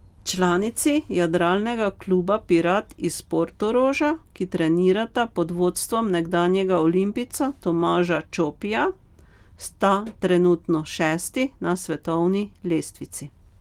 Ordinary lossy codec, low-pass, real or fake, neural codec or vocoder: Opus, 24 kbps; 19.8 kHz; real; none